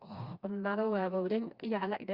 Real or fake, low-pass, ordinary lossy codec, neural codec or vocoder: fake; 5.4 kHz; Opus, 64 kbps; codec, 16 kHz, 2 kbps, FreqCodec, smaller model